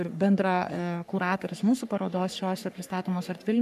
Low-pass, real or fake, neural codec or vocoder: 14.4 kHz; fake; codec, 44.1 kHz, 3.4 kbps, Pupu-Codec